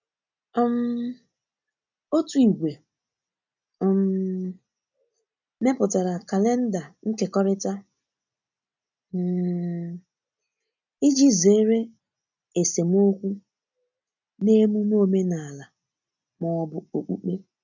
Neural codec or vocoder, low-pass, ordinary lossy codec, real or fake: none; 7.2 kHz; none; real